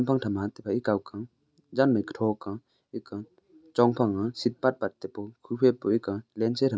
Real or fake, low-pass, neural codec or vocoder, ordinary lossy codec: real; none; none; none